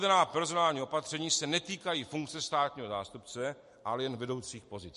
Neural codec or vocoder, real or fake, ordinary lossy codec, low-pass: none; real; MP3, 48 kbps; 14.4 kHz